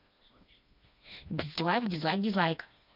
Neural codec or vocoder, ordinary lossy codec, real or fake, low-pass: codec, 16 kHz, 2 kbps, FreqCodec, smaller model; none; fake; 5.4 kHz